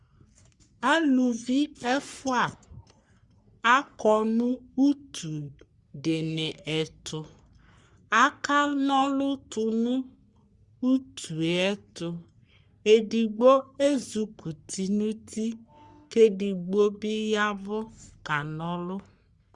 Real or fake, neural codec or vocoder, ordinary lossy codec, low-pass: fake; codec, 44.1 kHz, 3.4 kbps, Pupu-Codec; Opus, 64 kbps; 10.8 kHz